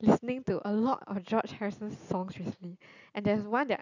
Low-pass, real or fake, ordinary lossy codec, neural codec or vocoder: 7.2 kHz; real; none; none